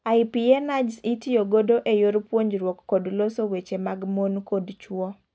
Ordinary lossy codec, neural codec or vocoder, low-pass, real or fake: none; none; none; real